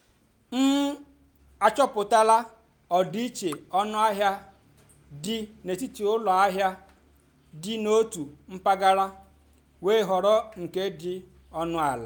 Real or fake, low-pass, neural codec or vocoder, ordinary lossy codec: real; none; none; none